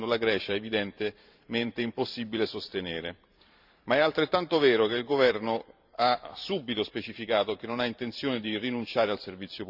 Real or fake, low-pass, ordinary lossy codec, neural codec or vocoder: fake; 5.4 kHz; Opus, 64 kbps; vocoder, 44.1 kHz, 128 mel bands every 512 samples, BigVGAN v2